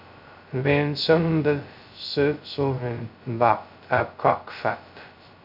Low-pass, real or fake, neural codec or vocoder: 5.4 kHz; fake; codec, 16 kHz, 0.2 kbps, FocalCodec